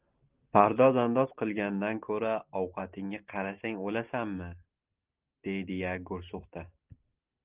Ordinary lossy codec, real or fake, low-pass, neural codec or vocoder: Opus, 32 kbps; real; 3.6 kHz; none